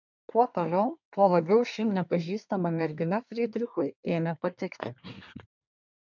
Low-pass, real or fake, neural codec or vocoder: 7.2 kHz; fake; codec, 24 kHz, 1 kbps, SNAC